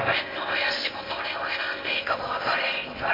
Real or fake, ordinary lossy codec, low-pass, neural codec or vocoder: fake; MP3, 32 kbps; 5.4 kHz; codec, 16 kHz in and 24 kHz out, 0.8 kbps, FocalCodec, streaming, 65536 codes